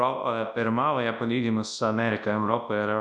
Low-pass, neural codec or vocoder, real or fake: 10.8 kHz; codec, 24 kHz, 0.9 kbps, WavTokenizer, large speech release; fake